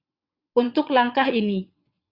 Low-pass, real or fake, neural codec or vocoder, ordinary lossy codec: 5.4 kHz; fake; vocoder, 22.05 kHz, 80 mel bands, WaveNeXt; Opus, 64 kbps